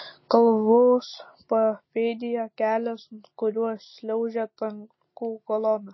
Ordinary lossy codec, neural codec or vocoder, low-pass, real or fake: MP3, 24 kbps; none; 7.2 kHz; real